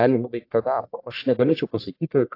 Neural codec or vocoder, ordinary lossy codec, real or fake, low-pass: codec, 44.1 kHz, 1.7 kbps, Pupu-Codec; AAC, 32 kbps; fake; 5.4 kHz